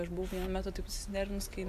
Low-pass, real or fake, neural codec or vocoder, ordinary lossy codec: 14.4 kHz; real; none; AAC, 96 kbps